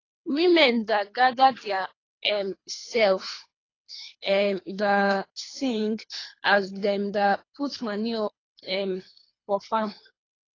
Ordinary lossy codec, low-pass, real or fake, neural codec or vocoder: AAC, 32 kbps; 7.2 kHz; fake; codec, 24 kHz, 3 kbps, HILCodec